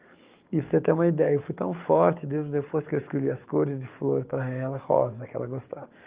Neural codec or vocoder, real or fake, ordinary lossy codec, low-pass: codec, 44.1 kHz, 7.8 kbps, DAC; fake; Opus, 24 kbps; 3.6 kHz